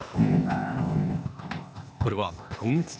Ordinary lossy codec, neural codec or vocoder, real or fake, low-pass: none; codec, 16 kHz, 0.8 kbps, ZipCodec; fake; none